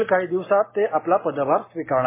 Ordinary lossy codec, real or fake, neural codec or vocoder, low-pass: MP3, 16 kbps; real; none; 3.6 kHz